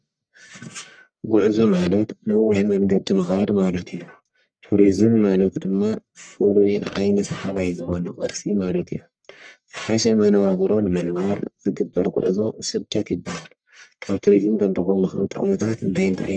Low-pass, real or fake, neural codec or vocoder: 9.9 kHz; fake; codec, 44.1 kHz, 1.7 kbps, Pupu-Codec